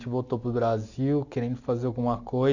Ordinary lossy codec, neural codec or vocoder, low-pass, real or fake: none; none; 7.2 kHz; real